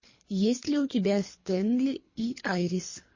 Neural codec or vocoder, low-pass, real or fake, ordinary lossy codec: codec, 24 kHz, 3 kbps, HILCodec; 7.2 kHz; fake; MP3, 32 kbps